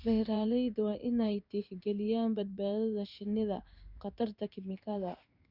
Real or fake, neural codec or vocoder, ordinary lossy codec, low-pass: fake; codec, 16 kHz in and 24 kHz out, 1 kbps, XY-Tokenizer; none; 5.4 kHz